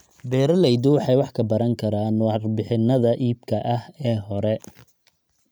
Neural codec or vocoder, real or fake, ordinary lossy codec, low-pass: none; real; none; none